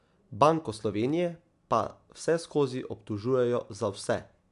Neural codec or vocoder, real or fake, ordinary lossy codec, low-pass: none; real; AAC, 64 kbps; 10.8 kHz